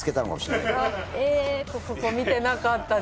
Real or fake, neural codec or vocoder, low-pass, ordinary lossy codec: real; none; none; none